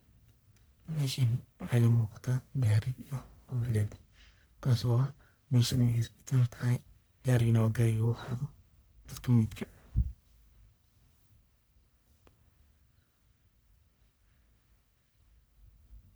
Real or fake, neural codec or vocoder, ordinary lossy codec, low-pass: fake; codec, 44.1 kHz, 1.7 kbps, Pupu-Codec; none; none